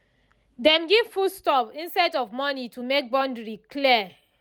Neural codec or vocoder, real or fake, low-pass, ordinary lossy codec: none; real; none; none